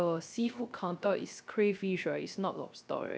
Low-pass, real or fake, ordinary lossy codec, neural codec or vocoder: none; fake; none; codec, 16 kHz, 0.3 kbps, FocalCodec